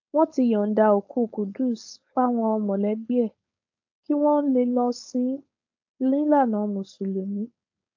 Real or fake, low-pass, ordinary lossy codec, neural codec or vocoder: fake; 7.2 kHz; AAC, 48 kbps; codec, 16 kHz, 4.8 kbps, FACodec